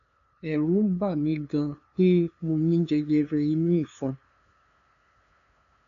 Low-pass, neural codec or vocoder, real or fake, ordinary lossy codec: 7.2 kHz; codec, 16 kHz, 2 kbps, FunCodec, trained on LibriTTS, 25 frames a second; fake; none